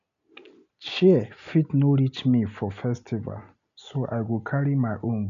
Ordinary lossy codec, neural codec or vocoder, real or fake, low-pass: none; none; real; 7.2 kHz